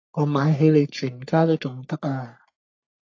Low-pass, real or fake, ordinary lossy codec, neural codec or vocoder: 7.2 kHz; fake; AAC, 48 kbps; codec, 44.1 kHz, 3.4 kbps, Pupu-Codec